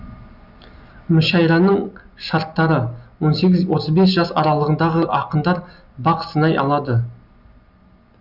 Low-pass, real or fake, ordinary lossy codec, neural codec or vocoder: 5.4 kHz; real; none; none